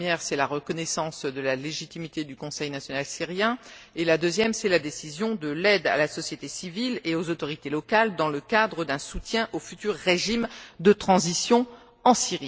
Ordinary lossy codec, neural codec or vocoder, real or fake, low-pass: none; none; real; none